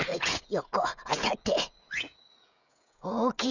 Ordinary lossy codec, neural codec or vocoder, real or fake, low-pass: none; codec, 16 kHz, 16 kbps, FreqCodec, smaller model; fake; 7.2 kHz